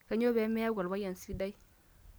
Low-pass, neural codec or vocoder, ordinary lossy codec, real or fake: none; none; none; real